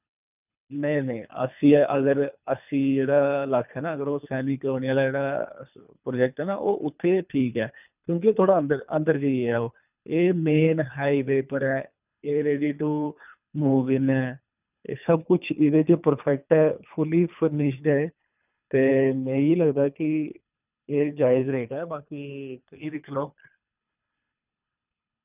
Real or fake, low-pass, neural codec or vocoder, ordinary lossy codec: fake; 3.6 kHz; codec, 24 kHz, 3 kbps, HILCodec; none